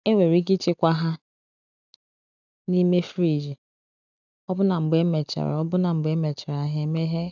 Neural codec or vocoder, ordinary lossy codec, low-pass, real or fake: none; none; 7.2 kHz; real